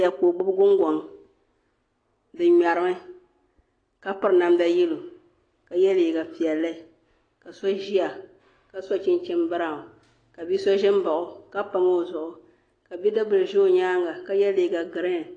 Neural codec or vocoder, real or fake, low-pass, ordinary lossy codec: none; real; 9.9 kHz; AAC, 32 kbps